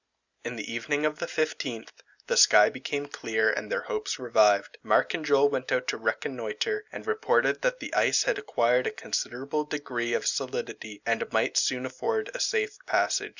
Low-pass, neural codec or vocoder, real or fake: 7.2 kHz; none; real